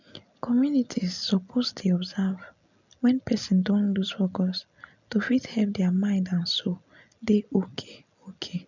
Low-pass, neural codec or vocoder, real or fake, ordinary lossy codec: 7.2 kHz; none; real; none